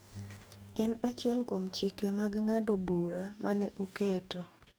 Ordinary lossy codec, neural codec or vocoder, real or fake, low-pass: none; codec, 44.1 kHz, 2.6 kbps, DAC; fake; none